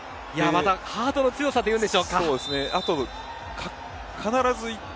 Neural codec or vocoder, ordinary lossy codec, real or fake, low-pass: none; none; real; none